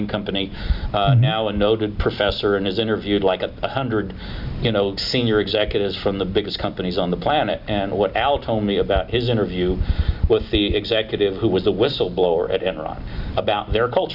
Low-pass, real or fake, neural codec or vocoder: 5.4 kHz; real; none